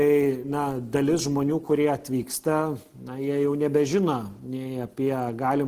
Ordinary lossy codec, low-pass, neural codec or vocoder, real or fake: Opus, 16 kbps; 14.4 kHz; none; real